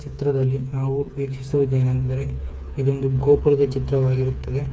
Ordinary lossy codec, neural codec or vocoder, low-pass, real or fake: none; codec, 16 kHz, 4 kbps, FreqCodec, smaller model; none; fake